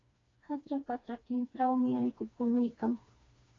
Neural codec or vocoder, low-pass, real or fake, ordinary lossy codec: codec, 16 kHz, 2 kbps, FreqCodec, smaller model; 7.2 kHz; fake; AAC, 32 kbps